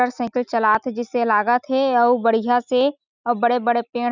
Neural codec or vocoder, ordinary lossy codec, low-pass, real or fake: none; none; 7.2 kHz; real